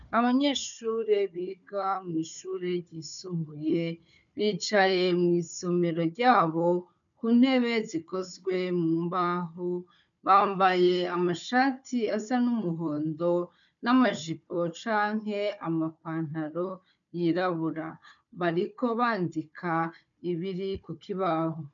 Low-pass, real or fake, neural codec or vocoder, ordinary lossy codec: 7.2 kHz; fake; codec, 16 kHz, 4 kbps, FunCodec, trained on Chinese and English, 50 frames a second; MP3, 96 kbps